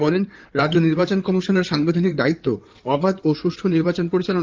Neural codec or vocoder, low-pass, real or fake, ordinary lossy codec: codec, 16 kHz in and 24 kHz out, 2.2 kbps, FireRedTTS-2 codec; 7.2 kHz; fake; Opus, 32 kbps